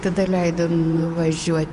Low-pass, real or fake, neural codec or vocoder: 10.8 kHz; fake; vocoder, 24 kHz, 100 mel bands, Vocos